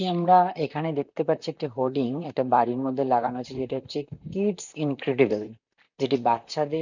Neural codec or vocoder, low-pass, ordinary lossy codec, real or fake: vocoder, 44.1 kHz, 128 mel bands, Pupu-Vocoder; 7.2 kHz; none; fake